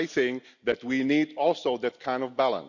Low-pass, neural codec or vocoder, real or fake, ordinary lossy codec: 7.2 kHz; none; real; none